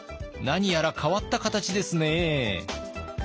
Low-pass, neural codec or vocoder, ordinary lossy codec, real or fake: none; none; none; real